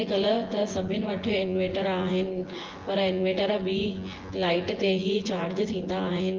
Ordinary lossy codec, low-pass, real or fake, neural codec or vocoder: Opus, 16 kbps; 7.2 kHz; fake; vocoder, 24 kHz, 100 mel bands, Vocos